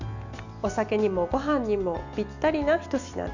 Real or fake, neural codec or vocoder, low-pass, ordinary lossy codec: real; none; 7.2 kHz; none